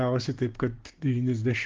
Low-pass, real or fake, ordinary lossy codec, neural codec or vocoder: 7.2 kHz; fake; Opus, 16 kbps; codec, 16 kHz, 6 kbps, DAC